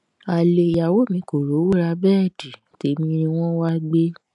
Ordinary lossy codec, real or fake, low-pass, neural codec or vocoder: none; real; 10.8 kHz; none